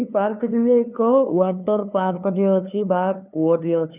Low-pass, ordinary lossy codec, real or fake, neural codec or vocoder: 3.6 kHz; none; fake; codec, 16 kHz, 2 kbps, FunCodec, trained on LibriTTS, 25 frames a second